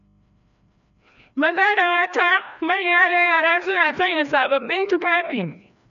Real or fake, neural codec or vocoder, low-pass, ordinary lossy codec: fake; codec, 16 kHz, 1 kbps, FreqCodec, larger model; 7.2 kHz; none